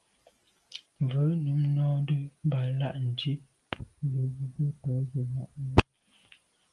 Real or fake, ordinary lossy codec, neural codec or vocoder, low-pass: real; Opus, 32 kbps; none; 10.8 kHz